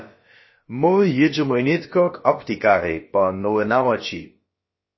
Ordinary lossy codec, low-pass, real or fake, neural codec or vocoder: MP3, 24 kbps; 7.2 kHz; fake; codec, 16 kHz, about 1 kbps, DyCAST, with the encoder's durations